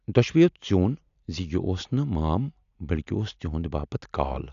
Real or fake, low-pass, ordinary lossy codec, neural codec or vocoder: real; 7.2 kHz; none; none